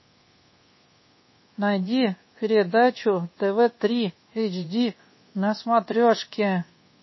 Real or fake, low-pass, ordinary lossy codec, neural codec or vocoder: fake; 7.2 kHz; MP3, 24 kbps; codec, 24 kHz, 1.2 kbps, DualCodec